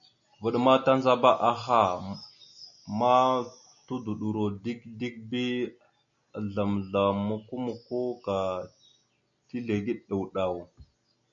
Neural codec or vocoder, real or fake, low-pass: none; real; 7.2 kHz